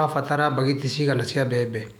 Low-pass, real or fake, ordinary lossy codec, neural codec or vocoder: 19.8 kHz; fake; none; autoencoder, 48 kHz, 128 numbers a frame, DAC-VAE, trained on Japanese speech